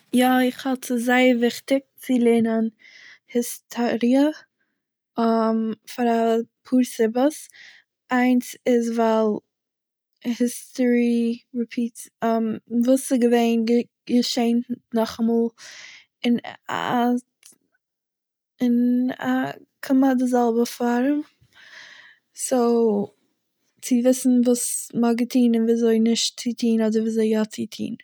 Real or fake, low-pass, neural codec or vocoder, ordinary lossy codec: real; none; none; none